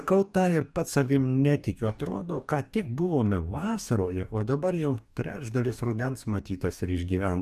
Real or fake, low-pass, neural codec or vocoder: fake; 14.4 kHz; codec, 44.1 kHz, 2.6 kbps, DAC